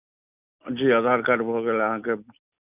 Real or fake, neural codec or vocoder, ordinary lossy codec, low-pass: real; none; none; 3.6 kHz